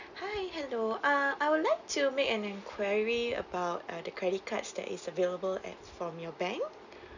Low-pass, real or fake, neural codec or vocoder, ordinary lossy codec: 7.2 kHz; real; none; none